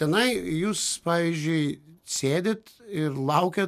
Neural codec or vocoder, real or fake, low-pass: none; real; 14.4 kHz